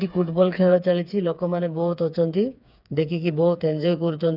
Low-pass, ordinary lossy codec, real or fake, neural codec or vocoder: 5.4 kHz; none; fake; codec, 16 kHz, 4 kbps, FreqCodec, smaller model